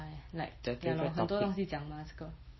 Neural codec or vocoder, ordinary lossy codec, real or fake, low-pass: none; MP3, 24 kbps; real; 7.2 kHz